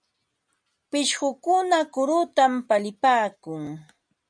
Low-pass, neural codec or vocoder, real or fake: 9.9 kHz; none; real